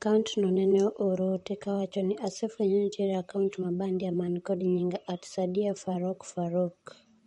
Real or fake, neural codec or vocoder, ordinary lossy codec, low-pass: fake; vocoder, 44.1 kHz, 128 mel bands, Pupu-Vocoder; MP3, 48 kbps; 19.8 kHz